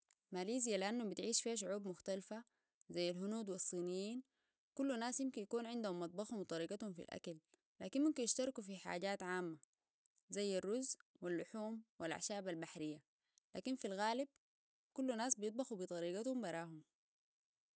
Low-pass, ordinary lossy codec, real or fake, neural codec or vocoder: none; none; real; none